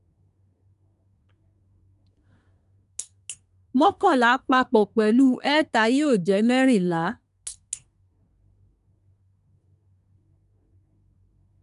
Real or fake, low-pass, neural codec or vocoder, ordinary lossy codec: fake; 10.8 kHz; codec, 24 kHz, 1 kbps, SNAC; none